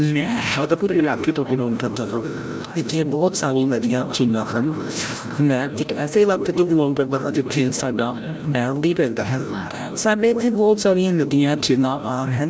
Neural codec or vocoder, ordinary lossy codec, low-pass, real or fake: codec, 16 kHz, 0.5 kbps, FreqCodec, larger model; none; none; fake